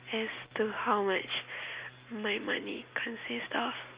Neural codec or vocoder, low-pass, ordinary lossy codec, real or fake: none; 3.6 kHz; Opus, 64 kbps; real